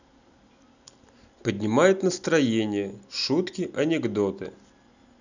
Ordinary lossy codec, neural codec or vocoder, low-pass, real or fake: none; none; 7.2 kHz; real